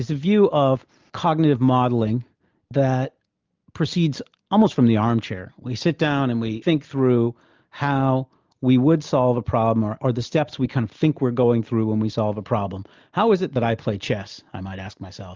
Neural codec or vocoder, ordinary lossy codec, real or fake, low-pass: none; Opus, 24 kbps; real; 7.2 kHz